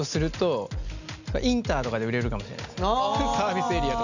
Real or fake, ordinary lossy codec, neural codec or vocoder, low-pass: real; none; none; 7.2 kHz